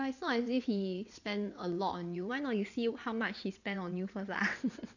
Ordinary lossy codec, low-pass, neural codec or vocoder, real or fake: none; 7.2 kHz; vocoder, 22.05 kHz, 80 mel bands, WaveNeXt; fake